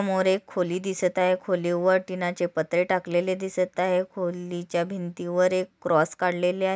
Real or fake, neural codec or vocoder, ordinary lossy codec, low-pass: real; none; none; none